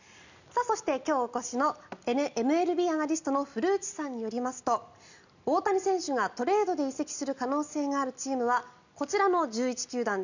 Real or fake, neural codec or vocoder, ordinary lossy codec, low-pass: real; none; none; 7.2 kHz